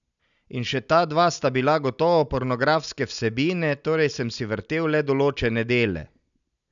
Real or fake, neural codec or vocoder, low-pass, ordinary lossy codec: real; none; 7.2 kHz; none